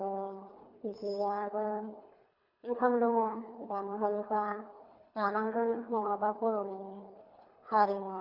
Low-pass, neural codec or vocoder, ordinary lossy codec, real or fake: 5.4 kHz; codec, 24 kHz, 3 kbps, HILCodec; Opus, 64 kbps; fake